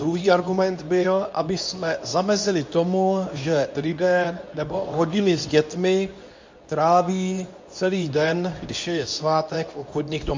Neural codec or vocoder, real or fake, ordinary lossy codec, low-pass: codec, 24 kHz, 0.9 kbps, WavTokenizer, medium speech release version 2; fake; MP3, 64 kbps; 7.2 kHz